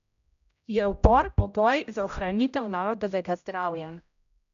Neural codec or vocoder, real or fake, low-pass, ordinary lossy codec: codec, 16 kHz, 0.5 kbps, X-Codec, HuBERT features, trained on general audio; fake; 7.2 kHz; none